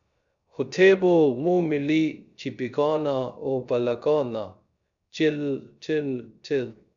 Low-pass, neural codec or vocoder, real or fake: 7.2 kHz; codec, 16 kHz, 0.3 kbps, FocalCodec; fake